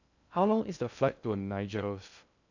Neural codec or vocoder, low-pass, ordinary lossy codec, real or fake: codec, 16 kHz in and 24 kHz out, 0.6 kbps, FocalCodec, streaming, 2048 codes; 7.2 kHz; none; fake